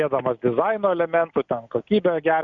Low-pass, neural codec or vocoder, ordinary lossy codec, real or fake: 7.2 kHz; none; Opus, 64 kbps; real